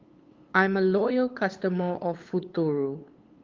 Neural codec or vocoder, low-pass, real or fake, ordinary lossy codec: codec, 16 kHz, 8 kbps, FunCodec, trained on LibriTTS, 25 frames a second; 7.2 kHz; fake; Opus, 32 kbps